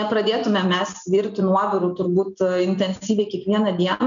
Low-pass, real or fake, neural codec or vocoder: 7.2 kHz; real; none